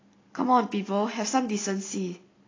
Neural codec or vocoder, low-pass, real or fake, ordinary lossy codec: none; 7.2 kHz; real; AAC, 32 kbps